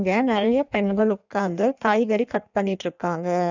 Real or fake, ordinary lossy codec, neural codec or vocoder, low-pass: fake; none; codec, 16 kHz in and 24 kHz out, 1.1 kbps, FireRedTTS-2 codec; 7.2 kHz